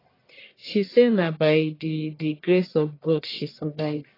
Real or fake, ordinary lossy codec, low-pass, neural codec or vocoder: fake; AAC, 24 kbps; 5.4 kHz; codec, 44.1 kHz, 1.7 kbps, Pupu-Codec